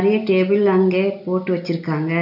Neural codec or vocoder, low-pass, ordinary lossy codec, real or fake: none; 5.4 kHz; none; real